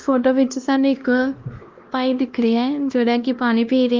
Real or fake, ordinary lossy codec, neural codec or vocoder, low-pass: fake; Opus, 32 kbps; codec, 16 kHz, 1 kbps, X-Codec, WavLM features, trained on Multilingual LibriSpeech; 7.2 kHz